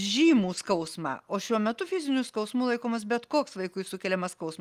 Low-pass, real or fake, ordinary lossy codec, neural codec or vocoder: 14.4 kHz; real; Opus, 32 kbps; none